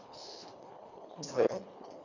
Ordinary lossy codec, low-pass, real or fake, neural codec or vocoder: none; 7.2 kHz; fake; codec, 24 kHz, 1.5 kbps, HILCodec